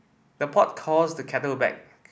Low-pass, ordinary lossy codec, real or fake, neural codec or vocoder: none; none; real; none